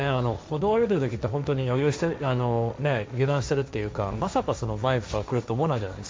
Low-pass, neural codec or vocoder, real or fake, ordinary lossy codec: none; codec, 16 kHz, 1.1 kbps, Voila-Tokenizer; fake; none